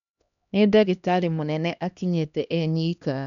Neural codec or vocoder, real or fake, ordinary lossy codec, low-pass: codec, 16 kHz, 1 kbps, X-Codec, HuBERT features, trained on LibriSpeech; fake; none; 7.2 kHz